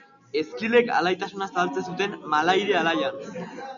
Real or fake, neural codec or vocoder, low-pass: real; none; 7.2 kHz